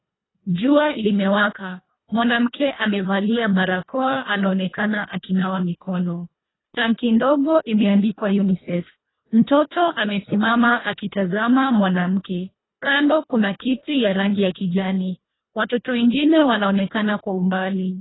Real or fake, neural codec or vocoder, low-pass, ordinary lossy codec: fake; codec, 24 kHz, 1.5 kbps, HILCodec; 7.2 kHz; AAC, 16 kbps